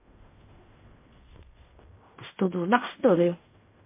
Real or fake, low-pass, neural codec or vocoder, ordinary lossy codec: fake; 3.6 kHz; codec, 16 kHz in and 24 kHz out, 0.4 kbps, LongCat-Audio-Codec, fine tuned four codebook decoder; MP3, 24 kbps